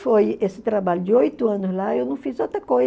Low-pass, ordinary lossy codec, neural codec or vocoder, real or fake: none; none; none; real